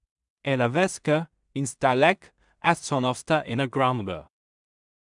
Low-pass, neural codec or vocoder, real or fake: 10.8 kHz; codec, 16 kHz in and 24 kHz out, 0.4 kbps, LongCat-Audio-Codec, two codebook decoder; fake